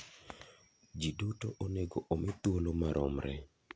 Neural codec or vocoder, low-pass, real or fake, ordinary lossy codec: none; none; real; none